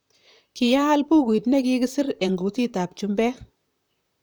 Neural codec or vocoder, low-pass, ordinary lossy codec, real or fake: vocoder, 44.1 kHz, 128 mel bands, Pupu-Vocoder; none; none; fake